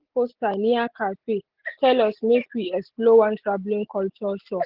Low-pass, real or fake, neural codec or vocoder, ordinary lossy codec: 5.4 kHz; real; none; Opus, 16 kbps